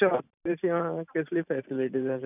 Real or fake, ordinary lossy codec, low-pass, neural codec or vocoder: fake; none; 3.6 kHz; vocoder, 44.1 kHz, 128 mel bands every 512 samples, BigVGAN v2